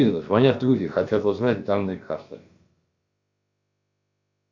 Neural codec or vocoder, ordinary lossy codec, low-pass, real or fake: codec, 16 kHz, about 1 kbps, DyCAST, with the encoder's durations; Opus, 64 kbps; 7.2 kHz; fake